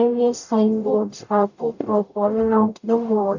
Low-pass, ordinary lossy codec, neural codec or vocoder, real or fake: 7.2 kHz; none; codec, 44.1 kHz, 0.9 kbps, DAC; fake